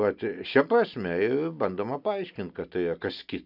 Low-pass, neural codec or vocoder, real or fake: 5.4 kHz; none; real